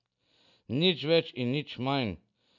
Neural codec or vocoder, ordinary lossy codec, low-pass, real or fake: none; none; 7.2 kHz; real